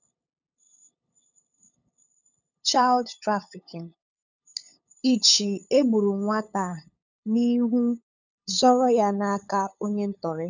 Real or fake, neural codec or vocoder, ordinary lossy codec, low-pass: fake; codec, 16 kHz, 8 kbps, FunCodec, trained on LibriTTS, 25 frames a second; none; 7.2 kHz